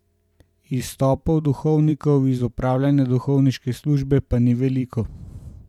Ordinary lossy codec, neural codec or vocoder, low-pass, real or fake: none; vocoder, 44.1 kHz, 128 mel bands every 512 samples, BigVGAN v2; 19.8 kHz; fake